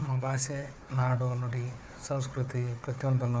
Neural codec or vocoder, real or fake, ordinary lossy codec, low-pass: codec, 16 kHz, 4 kbps, FunCodec, trained on Chinese and English, 50 frames a second; fake; none; none